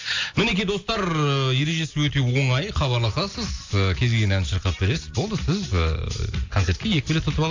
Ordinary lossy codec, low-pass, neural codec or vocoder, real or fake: AAC, 48 kbps; 7.2 kHz; none; real